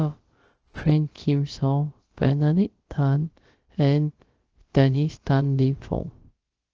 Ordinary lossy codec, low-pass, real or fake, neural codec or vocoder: Opus, 16 kbps; 7.2 kHz; fake; codec, 16 kHz, about 1 kbps, DyCAST, with the encoder's durations